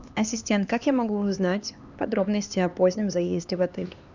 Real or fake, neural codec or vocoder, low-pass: fake; codec, 16 kHz, 2 kbps, X-Codec, HuBERT features, trained on LibriSpeech; 7.2 kHz